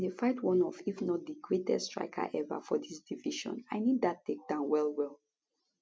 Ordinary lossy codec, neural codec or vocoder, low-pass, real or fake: none; none; none; real